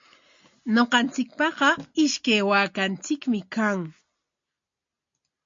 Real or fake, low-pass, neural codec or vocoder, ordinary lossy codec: real; 7.2 kHz; none; MP3, 48 kbps